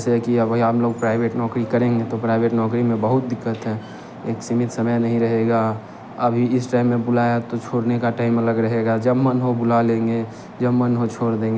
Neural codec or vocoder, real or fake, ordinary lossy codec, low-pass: none; real; none; none